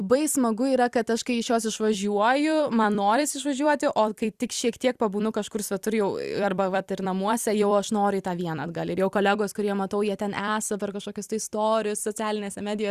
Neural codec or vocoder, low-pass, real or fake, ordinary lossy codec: vocoder, 44.1 kHz, 128 mel bands every 256 samples, BigVGAN v2; 14.4 kHz; fake; Opus, 64 kbps